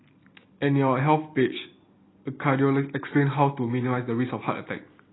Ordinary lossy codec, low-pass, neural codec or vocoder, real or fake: AAC, 16 kbps; 7.2 kHz; none; real